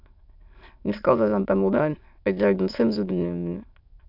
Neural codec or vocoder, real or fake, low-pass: autoencoder, 22.05 kHz, a latent of 192 numbers a frame, VITS, trained on many speakers; fake; 5.4 kHz